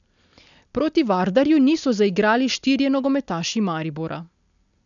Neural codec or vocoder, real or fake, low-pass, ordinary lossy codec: none; real; 7.2 kHz; none